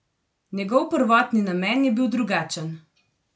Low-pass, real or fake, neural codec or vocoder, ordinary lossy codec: none; real; none; none